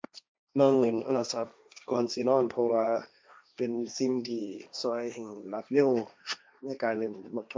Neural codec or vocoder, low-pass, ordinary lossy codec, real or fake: codec, 16 kHz, 1.1 kbps, Voila-Tokenizer; none; none; fake